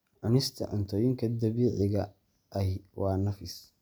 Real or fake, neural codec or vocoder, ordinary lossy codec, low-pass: real; none; none; none